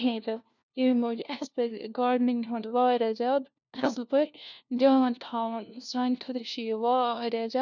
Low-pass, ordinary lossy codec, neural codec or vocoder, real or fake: 7.2 kHz; none; codec, 16 kHz, 1 kbps, FunCodec, trained on LibriTTS, 50 frames a second; fake